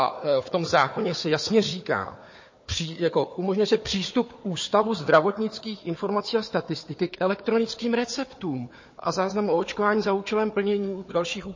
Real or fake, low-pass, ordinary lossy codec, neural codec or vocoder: fake; 7.2 kHz; MP3, 32 kbps; codec, 16 kHz, 4 kbps, FunCodec, trained on Chinese and English, 50 frames a second